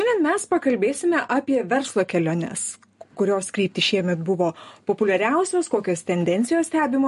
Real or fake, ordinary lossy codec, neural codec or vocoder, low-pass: real; MP3, 48 kbps; none; 14.4 kHz